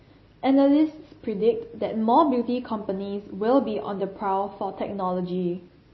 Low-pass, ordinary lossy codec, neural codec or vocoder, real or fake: 7.2 kHz; MP3, 24 kbps; none; real